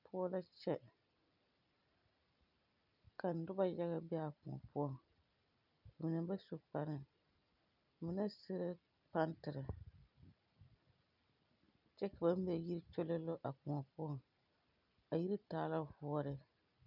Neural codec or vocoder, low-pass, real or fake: none; 5.4 kHz; real